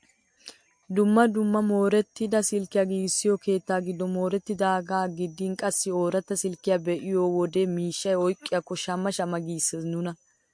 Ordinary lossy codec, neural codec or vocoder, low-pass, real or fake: MP3, 48 kbps; none; 9.9 kHz; real